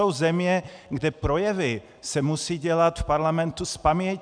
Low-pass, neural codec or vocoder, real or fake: 9.9 kHz; none; real